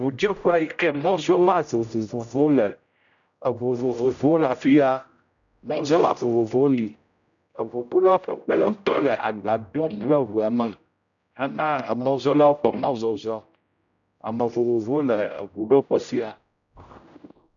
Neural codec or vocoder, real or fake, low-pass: codec, 16 kHz, 0.5 kbps, X-Codec, HuBERT features, trained on general audio; fake; 7.2 kHz